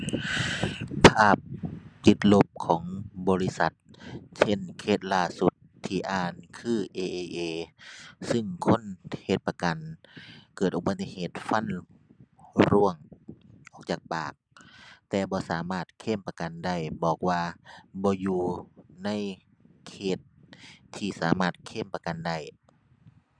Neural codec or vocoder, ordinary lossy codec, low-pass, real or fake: none; none; 9.9 kHz; real